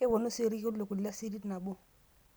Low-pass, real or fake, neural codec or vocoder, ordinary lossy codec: none; real; none; none